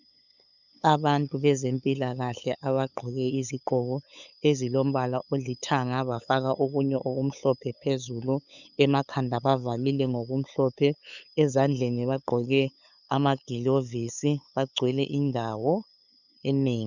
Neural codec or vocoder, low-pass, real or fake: codec, 16 kHz, 8 kbps, FunCodec, trained on LibriTTS, 25 frames a second; 7.2 kHz; fake